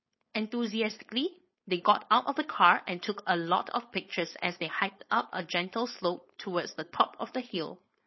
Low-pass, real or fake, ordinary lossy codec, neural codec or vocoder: 7.2 kHz; fake; MP3, 24 kbps; codec, 16 kHz, 4.8 kbps, FACodec